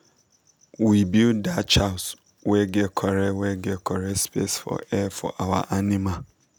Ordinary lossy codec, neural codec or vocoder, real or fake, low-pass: none; none; real; none